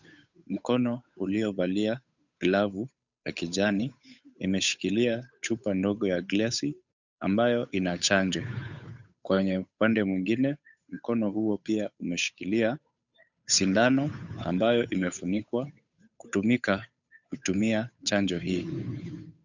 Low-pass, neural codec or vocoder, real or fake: 7.2 kHz; codec, 16 kHz, 8 kbps, FunCodec, trained on Chinese and English, 25 frames a second; fake